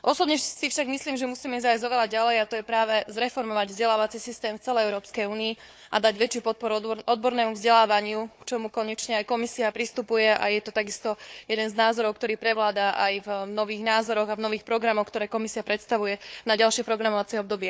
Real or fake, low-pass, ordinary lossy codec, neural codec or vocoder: fake; none; none; codec, 16 kHz, 4 kbps, FunCodec, trained on Chinese and English, 50 frames a second